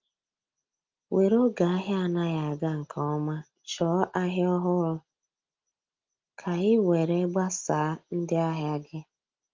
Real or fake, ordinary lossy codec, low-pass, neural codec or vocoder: real; Opus, 32 kbps; 7.2 kHz; none